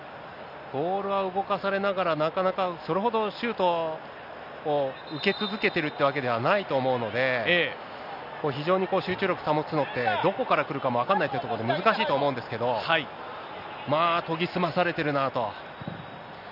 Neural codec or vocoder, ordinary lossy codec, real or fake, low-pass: none; none; real; 5.4 kHz